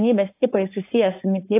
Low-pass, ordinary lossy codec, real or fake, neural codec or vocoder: 3.6 kHz; AAC, 24 kbps; fake; codec, 16 kHz, 4.8 kbps, FACodec